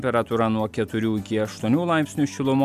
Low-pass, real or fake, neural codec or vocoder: 14.4 kHz; real; none